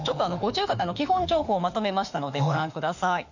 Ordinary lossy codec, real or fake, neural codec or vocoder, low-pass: none; fake; autoencoder, 48 kHz, 32 numbers a frame, DAC-VAE, trained on Japanese speech; 7.2 kHz